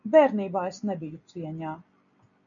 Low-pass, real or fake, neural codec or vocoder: 7.2 kHz; real; none